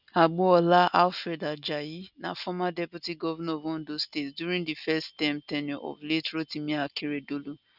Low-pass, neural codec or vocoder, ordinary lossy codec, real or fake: 5.4 kHz; none; none; real